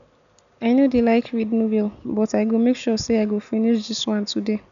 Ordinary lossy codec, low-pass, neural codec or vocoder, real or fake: none; 7.2 kHz; none; real